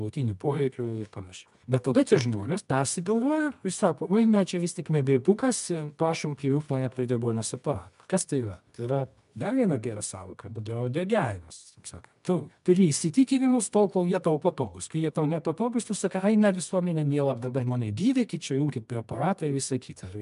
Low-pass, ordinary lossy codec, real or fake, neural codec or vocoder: 10.8 kHz; MP3, 96 kbps; fake; codec, 24 kHz, 0.9 kbps, WavTokenizer, medium music audio release